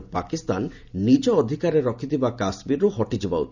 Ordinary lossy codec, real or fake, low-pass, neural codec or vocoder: none; real; 7.2 kHz; none